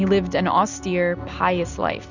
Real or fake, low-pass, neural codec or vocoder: real; 7.2 kHz; none